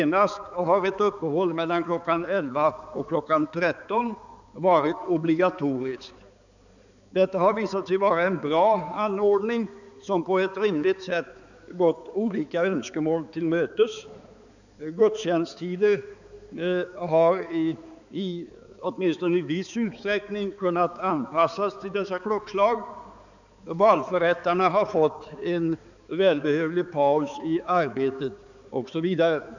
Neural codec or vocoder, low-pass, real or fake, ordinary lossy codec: codec, 16 kHz, 4 kbps, X-Codec, HuBERT features, trained on balanced general audio; 7.2 kHz; fake; none